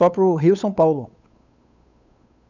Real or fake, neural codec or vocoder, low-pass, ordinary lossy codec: fake; codec, 16 kHz, 8 kbps, FunCodec, trained on Chinese and English, 25 frames a second; 7.2 kHz; none